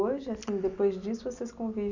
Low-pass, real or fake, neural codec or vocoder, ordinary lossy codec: 7.2 kHz; real; none; none